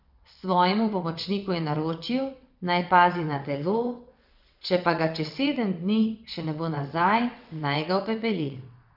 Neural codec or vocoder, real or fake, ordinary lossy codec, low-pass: vocoder, 22.05 kHz, 80 mel bands, WaveNeXt; fake; none; 5.4 kHz